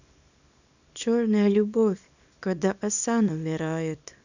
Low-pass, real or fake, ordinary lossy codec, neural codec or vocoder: 7.2 kHz; fake; none; codec, 24 kHz, 0.9 kbps, WavTokenizer, small release